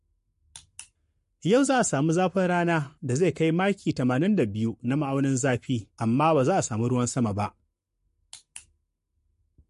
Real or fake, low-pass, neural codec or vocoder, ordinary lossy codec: fake; 14.4 kHz; codec, 44.1 kHz, 7.8 kbps, Pupu-Codec; MP3, 48 kbps